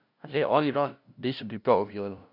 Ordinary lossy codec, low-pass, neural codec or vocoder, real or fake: none; 5.4 kHz; codec, 16 kHz, 0.5 kbps, FunCodec, trained on LibriTTS, 25 frames a second; fake